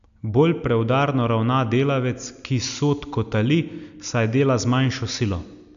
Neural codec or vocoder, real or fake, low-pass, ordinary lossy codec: none; real; 7.2 kHz; none